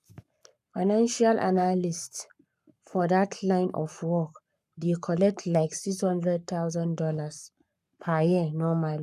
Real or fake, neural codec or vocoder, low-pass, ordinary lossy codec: fake; codec, 44.1 kHz, 7.8 kbps, Pupu-Codec; 14.4 kHz; none